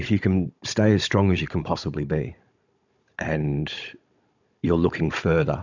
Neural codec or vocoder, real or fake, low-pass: codec, 16 kHz, 16 kbps, FunCodec, trained on Chinese and English, 50 frames a second; fake; 7.2 kHz